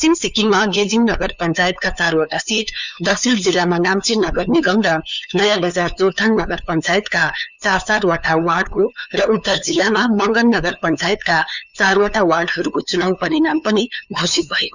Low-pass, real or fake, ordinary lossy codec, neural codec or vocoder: 7.2 kHz; fake; none; codec, 16 kHz, 2 kbps, FunCodec, trained on LibriTTS, 25 frames a second